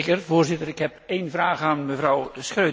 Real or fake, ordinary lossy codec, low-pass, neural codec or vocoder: real; none; none; none